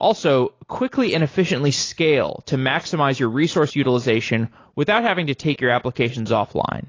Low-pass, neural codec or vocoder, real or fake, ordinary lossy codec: 7.2 kHz; none; real; AAC, 32 kbps